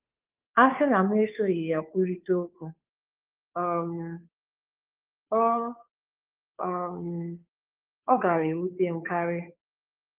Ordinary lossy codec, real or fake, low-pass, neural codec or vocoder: Opus, 32 kbps; fake; 3.6 kHz; codec, 16 kHz, 2 kbps, FunCodec, trained on Chinese and English, 25 frames a second